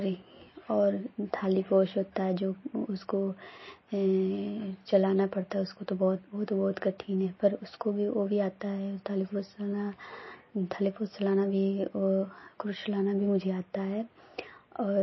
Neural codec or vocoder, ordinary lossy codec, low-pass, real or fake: none; MP3, 24 kbps; 7.2 kHz; real